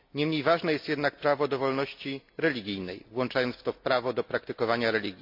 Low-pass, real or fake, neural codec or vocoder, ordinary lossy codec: 5.4 kHz; real; none; MP3, 48 kbps